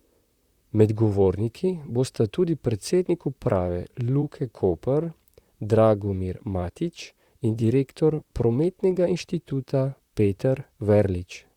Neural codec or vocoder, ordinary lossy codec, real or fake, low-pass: vocoder, 44.1 kHz, 128 mel bands, Pupu-Vocoder; Opus, 64 kbps; fake; 19.8 kHz